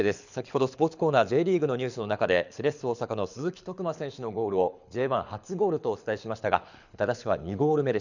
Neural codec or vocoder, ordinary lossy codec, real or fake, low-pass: codec, 24 kHz, 6 kbps, HILCodec; none; fake; 7.2 kHz